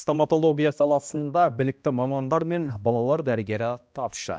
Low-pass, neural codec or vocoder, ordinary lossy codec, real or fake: none; codec, 16 kHz, 1 kbps, X-Codec, HuBERT features, trained on balanced general audio; none; fake